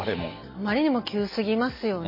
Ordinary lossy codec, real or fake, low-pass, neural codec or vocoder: MP3, 24 kbps; real; 5.4 kHz; none